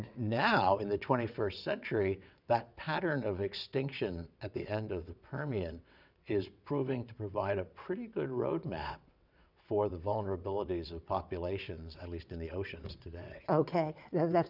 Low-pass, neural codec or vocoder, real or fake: 5.4 kHz; vocoder, 22.05 kHz, 80 mel bands, WaveNeXt; fake